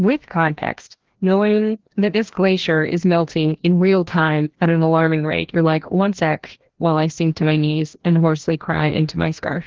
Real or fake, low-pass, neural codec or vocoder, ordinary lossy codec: fake; 7.2 kHz; codec, 16 kHz, 1 kbps, FreqCodec, larger model; Opus, 16 kbps